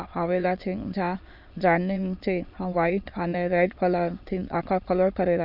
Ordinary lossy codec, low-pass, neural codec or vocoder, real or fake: AAC, 48 kbps; 5.4 kHz; autoencoder, 22.05 kHz, a latent of 192 numbers a frame, VITS, trained on many speakers; fake